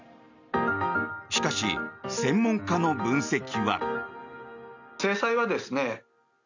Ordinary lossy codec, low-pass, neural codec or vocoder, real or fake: none; 7.2 kHz; none; real